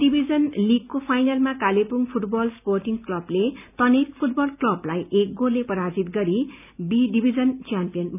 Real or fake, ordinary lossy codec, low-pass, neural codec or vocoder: real; none; 3.6 kHz; none